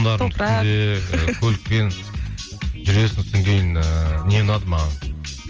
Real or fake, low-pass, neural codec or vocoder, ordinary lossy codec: real; 7.2 kHz; none; Opus, 24 kbps